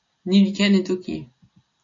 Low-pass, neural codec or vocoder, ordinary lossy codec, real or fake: 7.2 kHz; none; MP3, 48 kbps; real